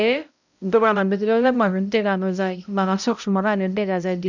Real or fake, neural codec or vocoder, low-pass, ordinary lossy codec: fake; codec, 16 kHz, 0.5 kbps, X-Codec, HuBERT features, trained on balanced general audio; 7.2 kHz; none